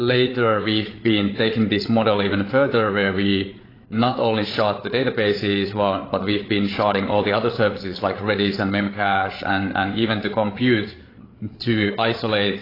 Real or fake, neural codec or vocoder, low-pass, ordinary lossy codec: fake; codec, 16 kHz, 8 kbps, FreqCodec, larger model; 5.4 kHz; AAC, 24 kbps